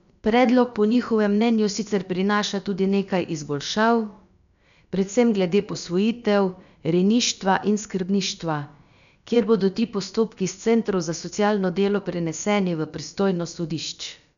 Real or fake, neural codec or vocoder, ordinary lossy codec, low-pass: fake; codec, 16 kHz, about 1 kbps, DyCAST, with the encoder's durations; none; 7.2 kHz